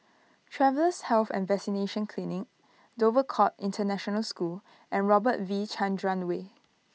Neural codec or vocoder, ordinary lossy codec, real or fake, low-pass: none; none; real; none